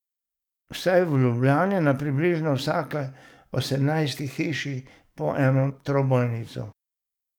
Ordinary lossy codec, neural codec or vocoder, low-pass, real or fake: none; codec, 44.1 kHz, 7.8 kbps, DAC; 19.8 kHz; fake